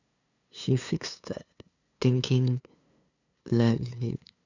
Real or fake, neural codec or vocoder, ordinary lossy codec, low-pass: fake; codec, 16 kHz, 2 kbps, FunCodec, trained on LibriTTS, 25 frames a second; none; 7.2 kHz